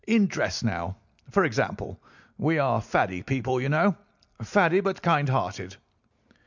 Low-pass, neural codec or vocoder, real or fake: 7.2 kHz; none; real